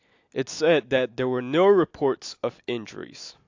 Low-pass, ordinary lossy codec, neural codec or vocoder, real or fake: 7.2 kHz; AAC, 48 kbps; none; real